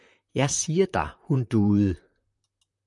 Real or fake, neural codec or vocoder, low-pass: fake; vocoder, 44.1 kHz, 128 mel bands, Pupu-Vocoder; 10.8 kHz